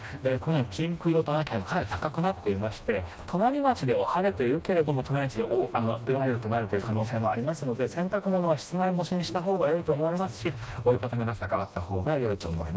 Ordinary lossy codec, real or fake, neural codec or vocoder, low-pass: none; fake; codec, 16 kHz, 1 kbps, FreqCodec, smaller model; none